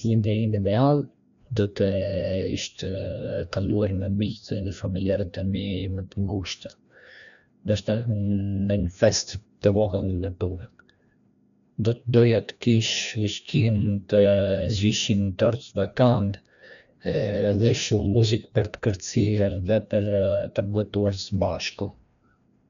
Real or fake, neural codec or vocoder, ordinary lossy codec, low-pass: fake; codec, 16 kHz, 1 kbps, FreqCodec, larger model; none; 7.2 kHz